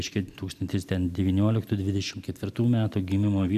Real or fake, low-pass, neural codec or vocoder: real; 14.4 kHz; none